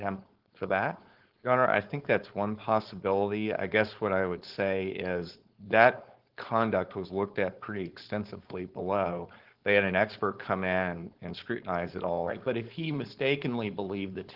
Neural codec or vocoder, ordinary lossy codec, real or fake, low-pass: codec, 16 kHz, 4.8 kbps, FACodec; Opus, 16 kbps; fake; 5.4 kHz